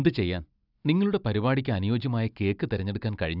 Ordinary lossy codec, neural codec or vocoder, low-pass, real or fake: none; none; 5.4 kHz; real